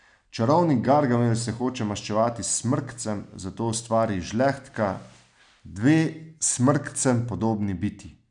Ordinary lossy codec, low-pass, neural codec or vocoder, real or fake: none; 9.9 kHz; none; real